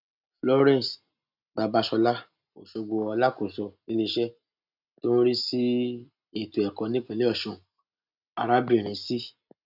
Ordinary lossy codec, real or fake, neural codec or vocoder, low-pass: none; real; none; 5.4 kHz